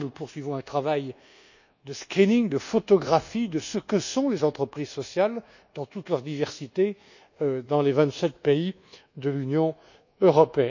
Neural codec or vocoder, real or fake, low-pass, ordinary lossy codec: codec, 24 kHz, 1.2 kbps, DualCodec; fake; 7.2 kHz; none